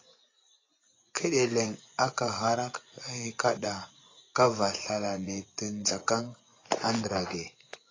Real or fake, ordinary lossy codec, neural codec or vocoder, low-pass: fake; AAC, 32 kbps; vocoder, 24 kHz, 100 mel bands, Vocos; 7.2 kHz